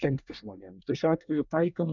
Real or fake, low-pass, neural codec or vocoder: fake; 7.2 kHz; codec, 24 kHz, 1 kbps, SNAC